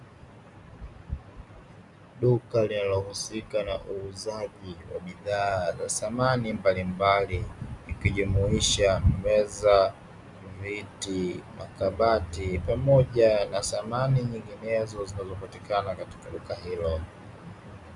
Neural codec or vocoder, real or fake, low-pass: none; real; 10.8 kHz